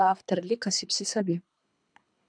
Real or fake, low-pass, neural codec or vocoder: fake; 9.9 kHz; codec, 24 kHz, 3 kbps, HILCodec